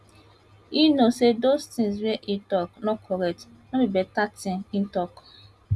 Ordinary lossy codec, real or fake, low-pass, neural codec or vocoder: none; real; none; none